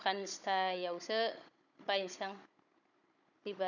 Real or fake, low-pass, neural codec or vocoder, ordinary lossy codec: fake; 7.2 kHz; codec, 16 kHz, 16 kbps, FunCodec, trained on Chinese and English, 50 frames a second; none